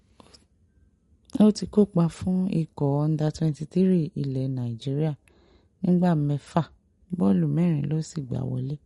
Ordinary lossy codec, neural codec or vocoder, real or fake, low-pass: MP3, 48 kbps; none; real; 19.8 kHz